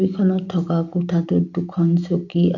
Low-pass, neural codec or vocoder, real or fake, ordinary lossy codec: 7.2 kHz; none; real; none